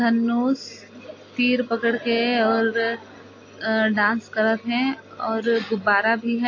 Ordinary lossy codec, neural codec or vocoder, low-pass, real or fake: AAC, 32 kbps; none; 7.2 kHz; real